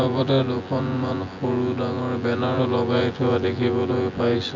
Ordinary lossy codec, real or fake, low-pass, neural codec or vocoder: MP3, 64 kbps; fake; 7.2 kHz; vocoder, 24 kHz, 100 mel bands, Vocos